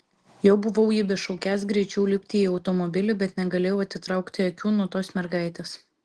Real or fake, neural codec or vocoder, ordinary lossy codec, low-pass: real; none; Opus, 16 kbps; 10.8 kHz